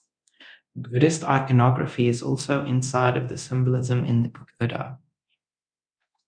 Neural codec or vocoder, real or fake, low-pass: codec, 24 kHz, 0.9 kbps, DualCodec; fake; 9.9 kHz